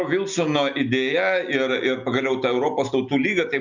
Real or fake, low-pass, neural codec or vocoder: real; 7.2 kHz; none